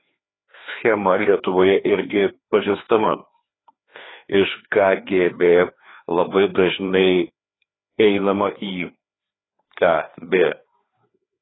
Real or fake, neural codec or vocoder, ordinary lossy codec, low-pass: fake; codec, 16 kHz, 2 kbps, FreqCodec, larger model; AAC, 16 kbps; 7.2 kHz